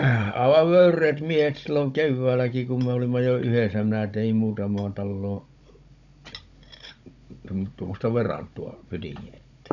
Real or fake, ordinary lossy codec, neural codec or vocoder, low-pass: fake; none; codec, 16 kHz, 16 kbps, FunCodec, trained on Chinese and English, 50 frames a second; 7.2 kHz